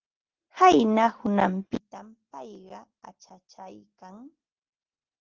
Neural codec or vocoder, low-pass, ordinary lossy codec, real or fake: none; 7.2 kHz; Opus, 16 kbps; real